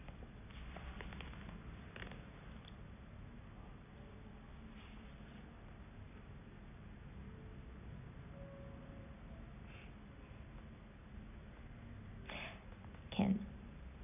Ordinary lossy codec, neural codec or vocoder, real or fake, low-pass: none; none; real; 3.6 kHz